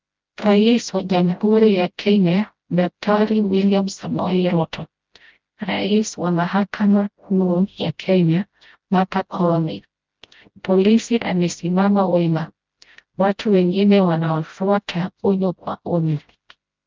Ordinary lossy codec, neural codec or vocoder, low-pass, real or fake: Opus, 24 kbps; codec, 16 kHz, 0.5 kbps, FreqCodec, smaller model; 7.2 kHz; fake